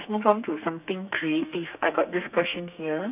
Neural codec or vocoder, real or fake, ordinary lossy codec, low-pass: codec, 32 kHz, 1.9 kbps, SNAC; fake; none; 3.6 kHz